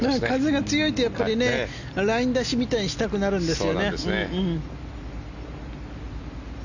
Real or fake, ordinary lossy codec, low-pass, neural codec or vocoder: real; none; 7.2 kHz; none